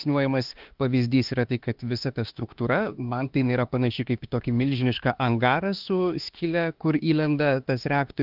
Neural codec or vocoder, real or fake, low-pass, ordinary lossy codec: autoencoder, 48 kHz, 32 numbers a frame, DAC-VAE, trained on Japanese speech; fake; 5.4 kHz; Opus, 32 kbps